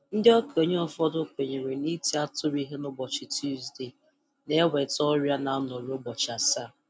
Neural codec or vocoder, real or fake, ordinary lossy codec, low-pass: none; real; none; none